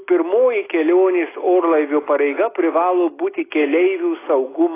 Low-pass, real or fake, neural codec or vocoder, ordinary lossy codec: 3.6 kHz; real; none; AAC, 16 kbps